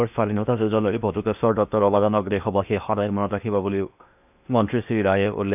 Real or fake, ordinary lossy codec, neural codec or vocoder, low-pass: fake; none; codec, 16 kHz in and 24 kHz out, 0.8 kbps, FocalCodec, streaming, 65536 codes; 3.6 kHz